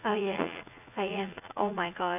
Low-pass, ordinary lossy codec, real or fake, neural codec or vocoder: 3.6 kHz; none; fake; vocoder, 44.1 kHz, 80 mel bands, Vocos